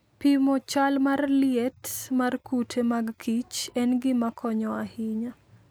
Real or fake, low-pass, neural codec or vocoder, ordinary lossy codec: real; none; none; none